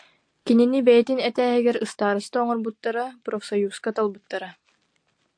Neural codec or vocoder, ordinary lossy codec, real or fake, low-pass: none; AAC, 64 kbps; real; 9.9 kHz